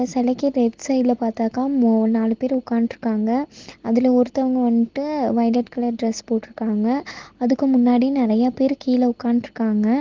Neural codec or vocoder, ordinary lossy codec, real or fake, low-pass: none; Opus, 16 kbps; real; 7.2 kHz